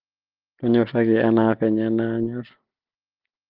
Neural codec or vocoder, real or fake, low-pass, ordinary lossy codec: none; real; 5.4 kHz; Opus, 16 kbps